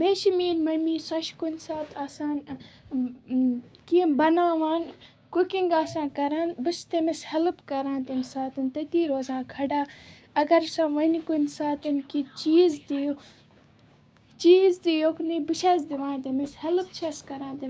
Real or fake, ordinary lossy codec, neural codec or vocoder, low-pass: fake; none; codec, 16 kHz, 6 kbps, DAC; none